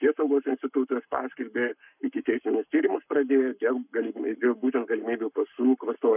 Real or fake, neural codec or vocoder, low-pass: fake; codec, 44.1 kHz, 7.8 kbps, Pupu-Codec; 3.6 kHz